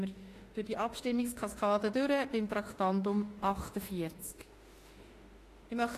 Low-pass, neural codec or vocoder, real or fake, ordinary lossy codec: 14.4 kHz; autoencoder, 48 kHz, 32 numbers a frame, DAC-VAE, trained on Japanese speech; fake; AAC, 48 kbps